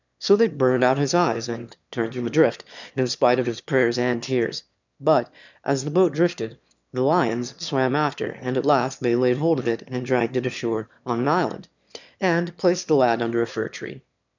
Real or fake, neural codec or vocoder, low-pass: fake; autoencoder, 22.05 kHz, a latent of 192 numbers a frame, VITS, trained on one speaker; 7.2 kHz